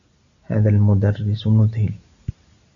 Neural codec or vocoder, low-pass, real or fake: none; 7.2 kHz; real